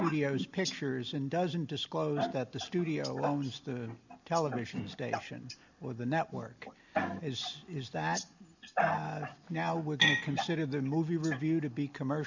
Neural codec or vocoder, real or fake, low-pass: none; real; 7.2 kHz